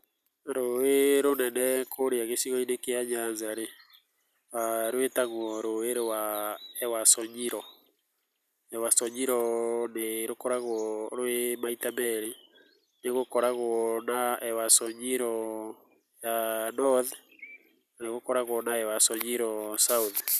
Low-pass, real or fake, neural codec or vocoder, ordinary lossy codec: 14.4 kHz; real; none; none